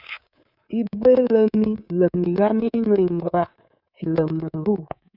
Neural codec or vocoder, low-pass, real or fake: codec, 24 kHz, 3.1 kbps, DualCodec; 5.4 kHz; fake